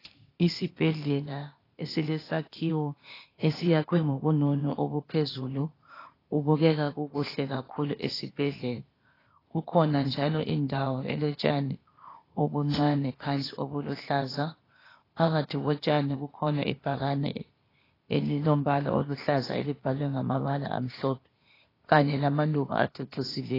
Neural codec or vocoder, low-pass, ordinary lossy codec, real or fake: codec, 16 kHz, 0.8 kbps, ZipCodec; 5.4 kHz; AAC, 24 kbps; fake